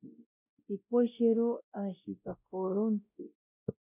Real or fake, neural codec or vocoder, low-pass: fake; codec, 16 kHz, 0.5 kbps, X-Codec, WavLM features, trained on Multilingual LibriSpeech; 3.6 kHz